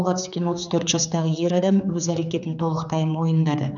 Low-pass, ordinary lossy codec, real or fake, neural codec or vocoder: 7.2 kHz; none; fake; codec, 16 kHz, 4 kbps, X-Codec, HuBERT features, trained on general audio